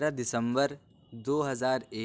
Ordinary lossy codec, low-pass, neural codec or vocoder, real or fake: none; none; none; real